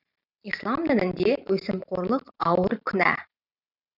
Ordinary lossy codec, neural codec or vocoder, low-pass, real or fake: none; vocoder, 44.1 kHz, 128 mel bands every 512 samples, BigVGAN v2; 5.4 kHz; fake